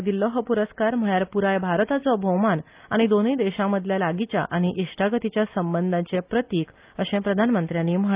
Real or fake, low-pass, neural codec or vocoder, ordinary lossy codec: real; 3.6 kHz; none; Opus, 24 kbps